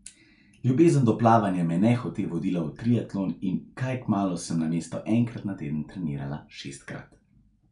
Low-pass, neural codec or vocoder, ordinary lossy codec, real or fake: 10.8 kHz; none; none; real